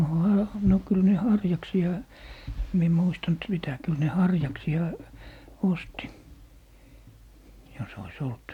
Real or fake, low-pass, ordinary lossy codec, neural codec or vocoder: real; 19.8 kHz; none; none